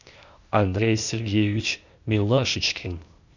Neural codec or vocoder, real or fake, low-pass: codec, 16 kHz, 0.8 kbps, ZipCodec; fake; 7.2 kHz